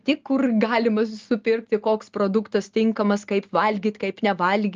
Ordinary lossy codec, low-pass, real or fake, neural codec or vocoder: Opus, 24 kbps; 7.2 kHz; real; none